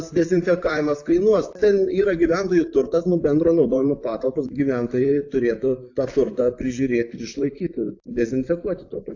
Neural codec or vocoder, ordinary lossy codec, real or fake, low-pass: vocoder, 44.1 kHz, 128 mel bands, Pupu-Vocoder; AAC, 48 kbps; fake; 7.2 kHz